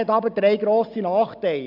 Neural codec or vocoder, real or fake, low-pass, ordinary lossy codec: none; real; 5.4 kHz; none